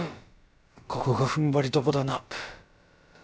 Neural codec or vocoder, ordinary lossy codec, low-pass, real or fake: codec, 16 kHz, about 1 kbps, DyCAST, with the encoder's durations; none; none; fake